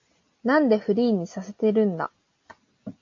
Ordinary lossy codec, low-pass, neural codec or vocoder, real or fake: AAC, 48 kbps; 7.2 kHz; none; real